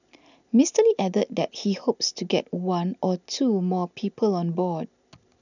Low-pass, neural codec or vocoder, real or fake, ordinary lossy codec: 7.2 kHz; none; real; none